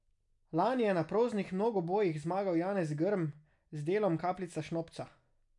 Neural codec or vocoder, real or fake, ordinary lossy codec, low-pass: none; real; none; 10.8 kHz